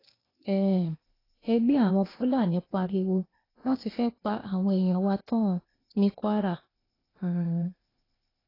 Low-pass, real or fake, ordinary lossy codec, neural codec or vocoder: 5.4 kHz; fake; AAC, 24 kbps; codec, 16 kHz, 0.8 kbps, ZipCodec